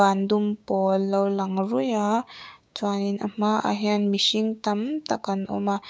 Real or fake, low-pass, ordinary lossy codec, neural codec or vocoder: fake; none; none; codec, 16 kHz, 6 kbps, DAC